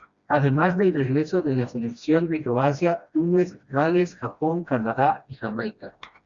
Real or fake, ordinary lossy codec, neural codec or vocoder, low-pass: fake; Opus, 64 kbps; codec, 16 kHz, 1 kbps, FreqCodec, smaller model; 7.2 kHz